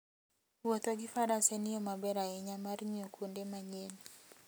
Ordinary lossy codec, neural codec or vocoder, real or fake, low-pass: none; none; real; none